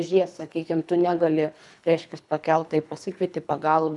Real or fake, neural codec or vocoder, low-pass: fake; codec, 24 kHz, 3 kbps, HILCodec; 10.8 kHz